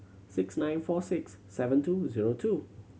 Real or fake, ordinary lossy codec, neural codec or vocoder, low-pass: real; none; none; none